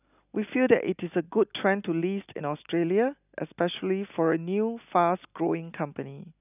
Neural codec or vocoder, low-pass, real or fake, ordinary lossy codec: none; 3.6 kHz; real; none